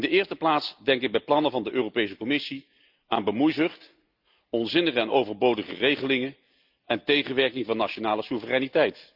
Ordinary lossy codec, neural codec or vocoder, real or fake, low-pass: Opus, 32 kbps; none; real; 5.4 kHz